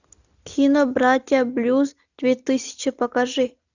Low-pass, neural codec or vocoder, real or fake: 7.2 kHz; none; real